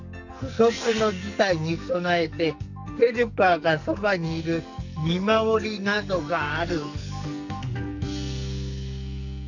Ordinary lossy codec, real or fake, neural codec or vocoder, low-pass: none; fake; codec, 44.1 kHz, 2.6 kbps, SNAC; 7.2 kHz